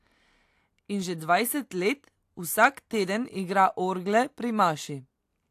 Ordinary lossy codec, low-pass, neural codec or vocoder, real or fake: AAC, 64 kbps; 14.4 kHz; none; real